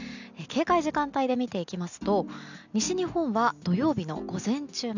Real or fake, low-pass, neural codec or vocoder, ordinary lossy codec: real; 7.2 kHz; none; none